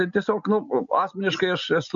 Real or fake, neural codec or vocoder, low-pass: real; none; 7.2 kHz